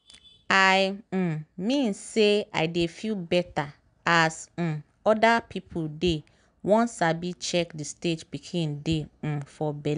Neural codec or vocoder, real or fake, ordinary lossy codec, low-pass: none; real; none; 9.9 kHz